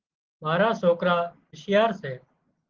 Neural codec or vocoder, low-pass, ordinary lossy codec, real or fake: none; 7.2 kHz; Opus, 16 kbps; real